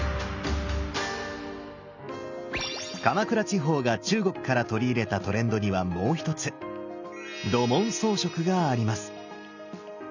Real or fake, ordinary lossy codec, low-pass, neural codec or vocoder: real; none; 7.2 kHz; none